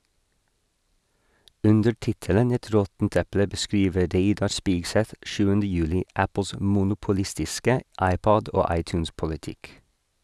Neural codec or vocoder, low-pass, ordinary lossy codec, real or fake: none; none; none; real